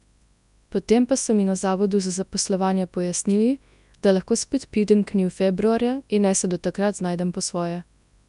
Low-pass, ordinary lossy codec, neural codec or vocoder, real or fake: 10.8 kHz; none; codec, 24 kHz, 0.9 kbps, WavTokenizer, large speech release; fake